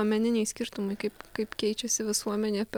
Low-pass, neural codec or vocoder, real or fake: 19.8 kHz; none; real